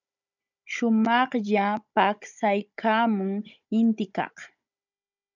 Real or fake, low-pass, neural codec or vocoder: fake; 7.2 kHz; codec, 16 kHz, 16 kbps, FunCodec, trained on Chinese and English, 50 frames a second